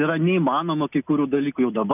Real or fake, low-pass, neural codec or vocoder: real; 3.6 kHz; none